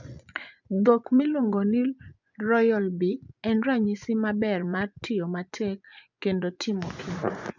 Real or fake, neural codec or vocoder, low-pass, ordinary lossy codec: fake; vocoder, 24 kHz, 100 mel bands, Vocos; 7.2 kHz; none